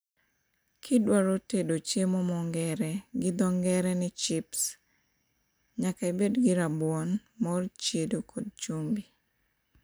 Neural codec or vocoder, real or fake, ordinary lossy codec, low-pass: none; real; none; none